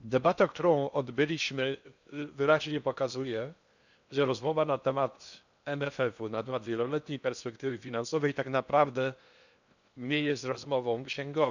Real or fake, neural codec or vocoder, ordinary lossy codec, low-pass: fake; codec, 16 kHz in and 24 kHz out, 0.8 kbps, FocalCodec, streaming, 65536 codes; none; 7.2 kHz